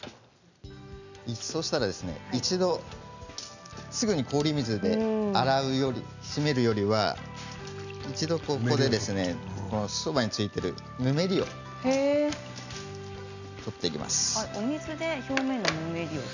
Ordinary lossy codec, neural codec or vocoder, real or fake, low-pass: none; none; real; 7.2 kHz